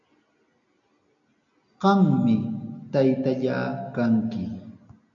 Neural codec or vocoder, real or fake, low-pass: none; real; 7.2 kHz